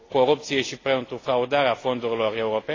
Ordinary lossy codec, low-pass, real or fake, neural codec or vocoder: AAC, 32 kbps; 7.2 kHz; real; none